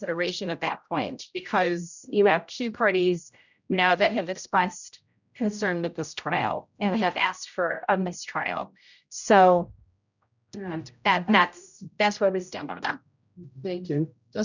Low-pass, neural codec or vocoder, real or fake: 7.2 kHz; codec, 16 kHz, 0.5 kbps, X-Codec, HuBERT features, trained on general audio; fake